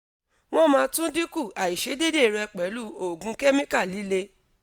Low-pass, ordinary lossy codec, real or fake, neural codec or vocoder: none; none; real; none